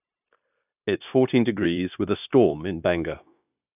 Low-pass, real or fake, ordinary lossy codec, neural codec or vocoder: 3.6 kHz; fake; none; codec, 16 kHz, 0.9 kbps, LongCat-Audio-Codec